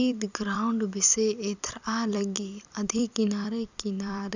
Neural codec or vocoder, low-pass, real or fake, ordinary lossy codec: vocoder, 44.1 kHz, 128 mel bands every 256 samples, BigVGAN v2; 7.2 kHz; fake; none